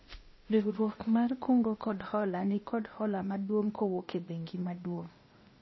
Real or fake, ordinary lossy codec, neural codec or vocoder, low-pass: fake; MP3, 24 kbps; codec, 16 kHz, 0.8 kbps, ZipCodec; 7.2 kHz